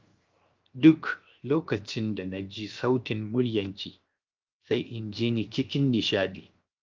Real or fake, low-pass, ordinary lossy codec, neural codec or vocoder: fake; 7.2 kHz; Opus, 32 kbps; codec, 16 kHz, 0.7 kbps, FocalCodec